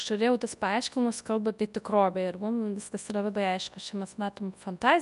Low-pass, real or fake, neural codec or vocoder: 10.8 kHz; fake; codec, 24 kHz, 0.9 kbps, WavTokenizer, large speech release